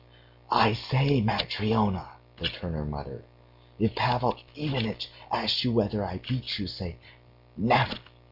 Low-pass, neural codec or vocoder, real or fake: 5.4 kHz; none; real